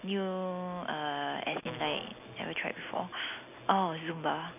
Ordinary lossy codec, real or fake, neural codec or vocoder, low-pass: none; real; none; 3.6 kHz